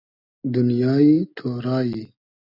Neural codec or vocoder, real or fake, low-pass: none; real; 5.4 kHz